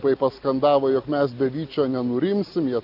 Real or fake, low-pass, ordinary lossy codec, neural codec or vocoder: real; 5.4 kHz; Opus, 64 kbps; none